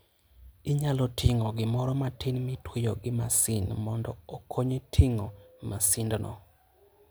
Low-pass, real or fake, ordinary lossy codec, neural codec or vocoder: none; real; none; none